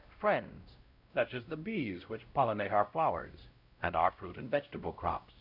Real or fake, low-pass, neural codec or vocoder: fake; 5.4 kHz; codec, 16 kHz, 0.5 kbps, X-Codec, WavLM features, trained on Multilingual LibriSpeech